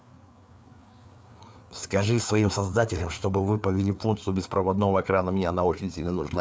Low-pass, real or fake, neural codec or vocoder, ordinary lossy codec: none; fake; codec, 16 kHz, 4 kbps, FreqCodec, larger model; none